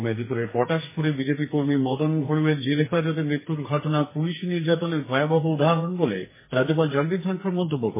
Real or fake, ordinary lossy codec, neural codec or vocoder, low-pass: fake; MP3, 16 kbps; codec, 44.1 kHz, 2.6 kbps, SNAC; 3.6 kHz